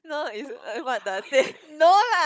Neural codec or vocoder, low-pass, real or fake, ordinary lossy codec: codec, 16 kHz, 16 kbps, FunCodec, trained on Chinese and English, 50 frames a second; none; fake; none